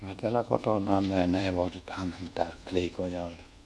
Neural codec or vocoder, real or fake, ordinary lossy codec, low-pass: codec, 24 kHz, 1.2 kbps, DualCodec; fake; none; none